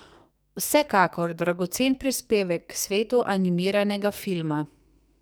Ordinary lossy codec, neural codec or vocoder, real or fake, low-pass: none; codec, 44.1 kHz, 2.6 kbps, SNAC; fake; none